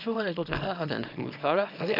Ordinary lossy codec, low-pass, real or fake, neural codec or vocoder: none; 5.4 kHz; fake; codec, 24 kHz, 0.9 kbps, WavTokenizer, small release